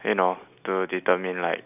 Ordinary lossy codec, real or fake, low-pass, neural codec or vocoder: none; real; 3.6 kHz; none